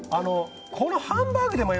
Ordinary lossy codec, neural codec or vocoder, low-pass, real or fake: none; none; none; real